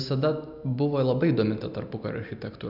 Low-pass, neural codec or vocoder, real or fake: 5.4 kHz; none; real